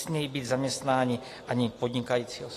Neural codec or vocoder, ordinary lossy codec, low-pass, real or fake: none; AAC, 48 kbps; 14.4 kHz; real